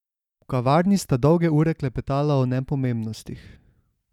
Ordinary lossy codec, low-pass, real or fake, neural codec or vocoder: none; 19.8 kHz; real; none